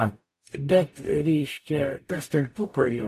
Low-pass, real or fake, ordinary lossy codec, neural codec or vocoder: 14.4 kHz; fake; AAC, 64 kbps; codec, 44.1 kHz, 0.9 kbps, DAC